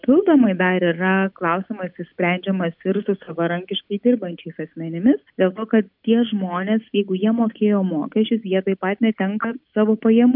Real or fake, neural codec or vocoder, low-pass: real; none; 5.4 kHz